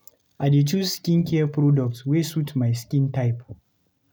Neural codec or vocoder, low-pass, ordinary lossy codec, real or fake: none; none; none; real